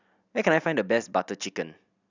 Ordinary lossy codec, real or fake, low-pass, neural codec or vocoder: none; real; 7.2 kHz; none